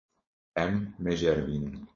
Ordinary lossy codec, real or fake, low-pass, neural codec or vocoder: MP3, 32 kbps; fake; 7.2 kHz; codec, 16 kHz, 4.8 kbps, FACodec